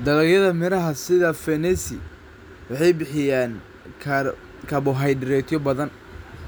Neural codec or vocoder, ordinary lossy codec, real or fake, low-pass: none; none; real; none